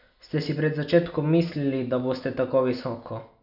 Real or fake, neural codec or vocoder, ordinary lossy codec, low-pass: real; none; none; 5.4 kHz